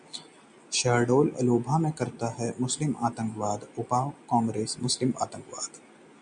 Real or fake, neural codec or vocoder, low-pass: real; none; 9.9 kHz